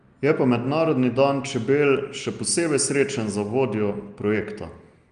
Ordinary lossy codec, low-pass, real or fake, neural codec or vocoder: Opus, 32 kbps; 9.9 kHz; real; none